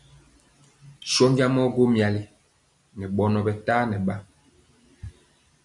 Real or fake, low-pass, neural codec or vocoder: real; 10.8 kHz; none